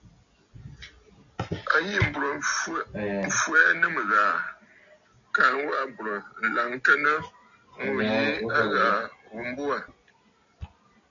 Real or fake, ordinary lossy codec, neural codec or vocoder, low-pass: real; MP3, 96 kbps; none; 7.2 kHz